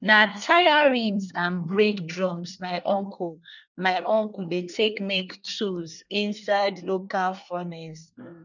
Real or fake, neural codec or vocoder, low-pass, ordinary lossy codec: fake; codec, 24 kHz, 1 kbps, SNAC; 7.2 kHz; none